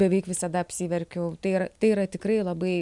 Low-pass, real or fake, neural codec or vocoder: 10.8 kHz; real; none